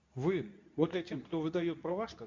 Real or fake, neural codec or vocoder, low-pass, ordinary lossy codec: fake; codec, 24 kHz, 0.9 kbps, WavTokenizer, medium speech release version 2; 7.2 kHz; MP3, 64 kbps